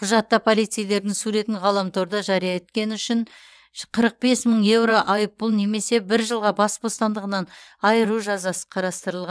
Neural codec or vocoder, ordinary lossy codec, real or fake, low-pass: vocoder, 22.05 kHz, 80 mel bands, WaveNeXt; none; fake; none